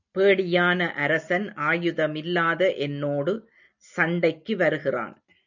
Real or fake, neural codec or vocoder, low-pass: real; none; 7.2 kHz